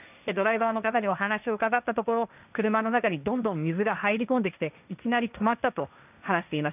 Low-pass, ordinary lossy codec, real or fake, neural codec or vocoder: 3.6 kHz; none; fake; codec, 16 kHz, 1.1 kbps, Voila-Tokenizer